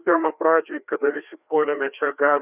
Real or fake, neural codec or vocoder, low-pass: fake; codec, 16 kHz, 2 kbps, FreqCodec, larger model; 3.6 kHz